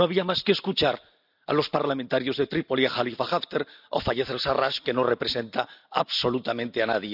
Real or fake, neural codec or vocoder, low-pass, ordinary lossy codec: real; none; 5.4 kHz; none